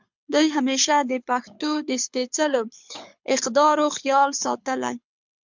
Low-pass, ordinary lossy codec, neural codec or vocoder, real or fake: 7.2 kHz; MP3, 64 kbps; codec, 24 kHz, 6 kbps, HILCodec; fake